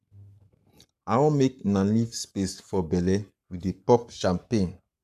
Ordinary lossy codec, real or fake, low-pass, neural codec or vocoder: none; fake; 14.4 kHz; codec, 44.1 kHz, 7.8 kbps, Pupu-Codec